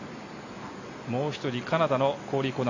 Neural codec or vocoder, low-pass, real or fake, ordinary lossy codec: none; 7.2 kHz; real; AAC, 48 kbps